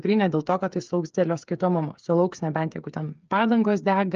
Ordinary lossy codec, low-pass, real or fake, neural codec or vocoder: Opus, 24 kbps; 7.2 kHz; fake; codec, 16 kHz, 8 kbps, FreqCodec, smaller model